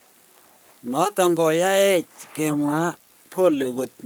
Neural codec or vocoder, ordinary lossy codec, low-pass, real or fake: codec, 44.1 kHz, 3.4 kbps, Pupu-Codec; none; none; fake